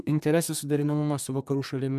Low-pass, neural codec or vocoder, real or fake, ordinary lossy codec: 14.4 kHz; codec, 32 kHz, 1.9 kbps, SNAC; fake; MP3, 96 kbps